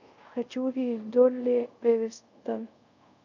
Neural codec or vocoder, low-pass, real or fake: codec, 24 kHz, 0.5 kbps, DualCodec; 7.2 kHz; fake